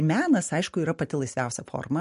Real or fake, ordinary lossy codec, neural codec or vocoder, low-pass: real; MP3, 48 kbps; none; 14.4 kHz